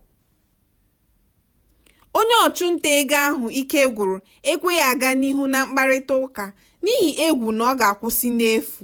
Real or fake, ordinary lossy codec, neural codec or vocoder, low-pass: fake; none; vocoder, 48 kHz, 128 mel bands, Vocos; none